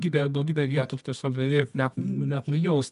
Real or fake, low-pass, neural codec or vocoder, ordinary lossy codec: fake; 10.8 kHz; codec, 24 kHz, 0.9 kbps, WavTokenizer, medium music audio release; MP3, 96 kbps